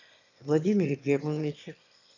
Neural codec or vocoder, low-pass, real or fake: autoencoder, 22.05 kHz, a latent of 192 numbers a frame, VITS, trained on one speaker; 7.2 kHz; fake